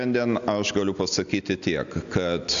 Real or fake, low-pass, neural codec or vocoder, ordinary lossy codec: real; 7.2 kHz; none; MP3, 96 kbps